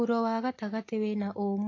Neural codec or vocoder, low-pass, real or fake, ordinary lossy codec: none; 7.2 kHz; real; AAC, 32 kbps